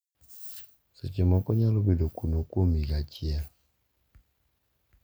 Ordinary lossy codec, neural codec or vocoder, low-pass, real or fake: none; none; none; real